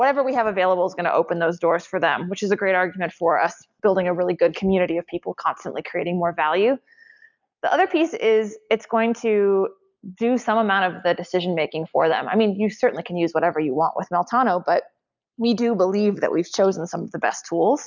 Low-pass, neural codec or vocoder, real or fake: 7.2 kHz; none; real